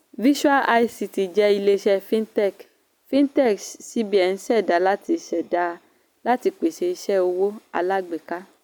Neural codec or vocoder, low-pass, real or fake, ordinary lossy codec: none; none; real; none